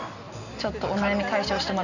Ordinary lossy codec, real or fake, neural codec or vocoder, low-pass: none; real; none; 7.2 kHz